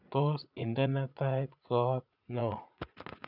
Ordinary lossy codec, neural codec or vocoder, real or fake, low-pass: none; vocoder, 44.1 kHz, 80 mel bands, Vocos; fake; 5.4 kHz